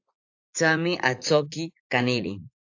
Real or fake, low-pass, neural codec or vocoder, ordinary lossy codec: fake; 7.2 kHz; vocoder, 44.1 kHz, 80 mel bands, Vocos; AAC, 48 kbps